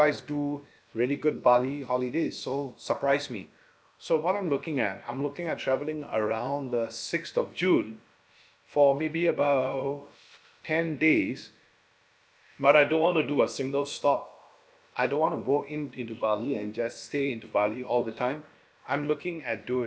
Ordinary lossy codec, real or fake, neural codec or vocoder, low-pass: none; fake; codec, 16 kHz, about 1 kbps, DyCAST, with the encoder's durations; none